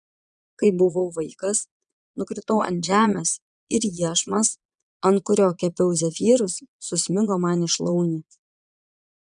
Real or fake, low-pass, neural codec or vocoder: fake; 9.9 kHz; vocoder, 22.05 kHz, 80 mel bands, Vocos